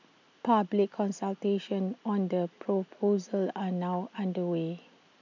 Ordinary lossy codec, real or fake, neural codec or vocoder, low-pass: none; real; none; 7.2 kHz